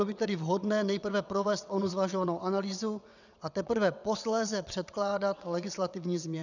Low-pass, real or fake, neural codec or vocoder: 7.2 kHz; fake; vocoder, 22.05 kHz, 80 mel bands, WaveNeXt